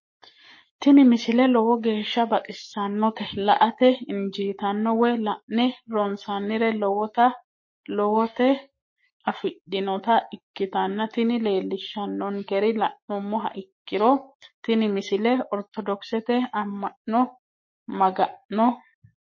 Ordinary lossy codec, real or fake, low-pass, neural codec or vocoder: MP3, 32 kbps; fake; 7.2 kHz; codec, 44.1 kHz, 7.8 kbps, Pupu-Codec